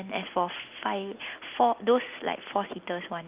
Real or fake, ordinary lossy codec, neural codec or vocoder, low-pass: real; Opus, 32 kbps; none; 3.6 kHz